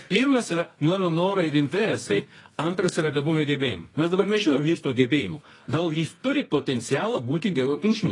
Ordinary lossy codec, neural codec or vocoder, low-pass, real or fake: AAC, 32 kbps; codec, 24 kHz, 0.9 kbps, WavTokenizer, medium music audio release; 10.8 kHz; fake